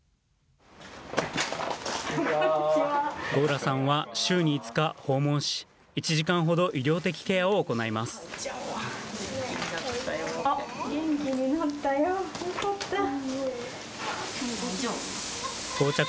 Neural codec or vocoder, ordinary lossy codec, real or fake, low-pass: none; none; real; none